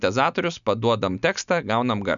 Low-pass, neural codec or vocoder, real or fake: 7.2 kHz; none; real